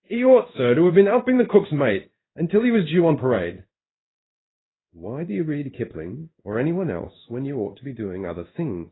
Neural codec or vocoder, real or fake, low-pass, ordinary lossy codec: codec, 16 kHz in and 24 kHz out, 1 kbps, XY-Tokenizer; fake; 7.2 kHz; AAC, 16 kbps